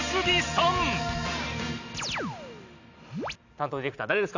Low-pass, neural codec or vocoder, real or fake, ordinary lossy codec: 7.2 kHz; none; real; none